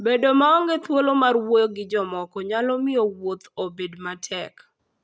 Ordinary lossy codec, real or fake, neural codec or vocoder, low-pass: none; real; none; none